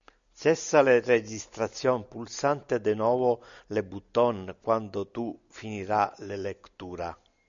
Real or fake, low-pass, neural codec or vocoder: real; 7.2 kHz; none